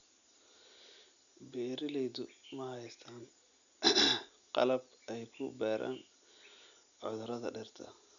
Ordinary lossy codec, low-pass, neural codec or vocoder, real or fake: none; 7.2 kHz; none; real